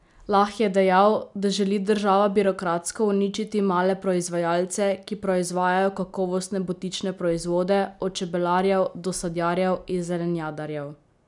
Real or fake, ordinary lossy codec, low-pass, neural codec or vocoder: real; none; 10.8 kHz; none